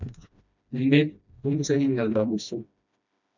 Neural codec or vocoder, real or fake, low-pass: codec, 16 kHz, 1 kbps, FreqCodec, smaller model; fake; 7.2 kHz